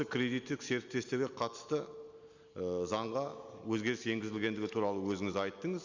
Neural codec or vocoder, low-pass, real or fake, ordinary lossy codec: none; 7.2 kHz; real; Opus, 64 kbps